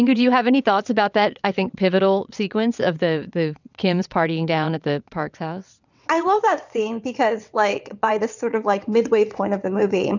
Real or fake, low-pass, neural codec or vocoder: fake; 7.2 kHz; vocoder, 22.05 kHz, 80 mel bands, WaveNeXt